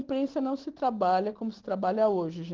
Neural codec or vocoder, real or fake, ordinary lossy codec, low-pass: none; real; Opus, 16 kbps; 7.2 kHz